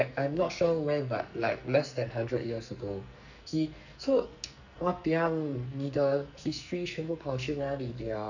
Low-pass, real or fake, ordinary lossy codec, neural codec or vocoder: 7.2 kHz; fake; none; codec, 44.1 kHz, 2.6 kbps, SNAC